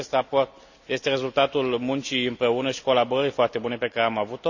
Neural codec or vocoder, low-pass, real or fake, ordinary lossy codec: none; 7.2 kHz; real; none